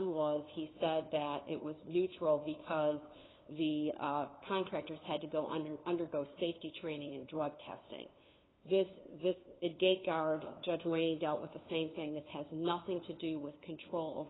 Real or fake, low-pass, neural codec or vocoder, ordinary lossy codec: fake; 7.2 kHz; codec, 16 kHz, 2 kbps, FunCodec, trained on LibriTTS, 25 frames a second; AAC, 16 kbps